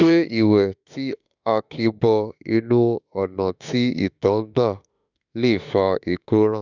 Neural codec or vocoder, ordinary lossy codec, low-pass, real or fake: autoencoder, 48 kHz, 32 numbers a frame, DAC-VAE, trained on Japanese speech; none; 7.2 kHz; fake